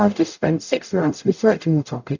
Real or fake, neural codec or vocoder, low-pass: fake; codec, 44.1 kHz, 0.9 kbps, DAC; 7.2 kHz